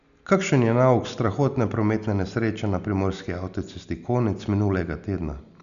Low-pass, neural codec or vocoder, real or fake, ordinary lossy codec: 7.2 kHz; none; real; none